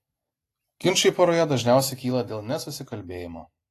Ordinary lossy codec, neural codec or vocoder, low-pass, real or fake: AAC, 48 kbps; none; 14.4 kHz; real